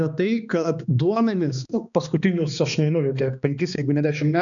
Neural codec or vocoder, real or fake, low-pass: codec, 16 kHz, 2 kbps, X-Codec, HuBERT features, trained on balanced general audio; fake; 7.2 kHz